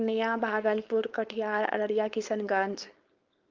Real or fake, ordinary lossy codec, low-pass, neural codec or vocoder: fake; Opus, 32 kbps; 7.2 kHz; codec, 16 kHz, 4.8 kbps, FACodec